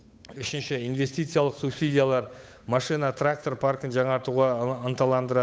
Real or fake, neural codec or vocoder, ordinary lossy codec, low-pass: fake; codec, 16 kHz, 8 kbps, FunCodec, trained on Chinese and English, 25 frames a second; none; none